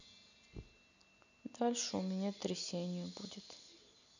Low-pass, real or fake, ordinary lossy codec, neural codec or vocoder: 7.2 kHz; real; none; none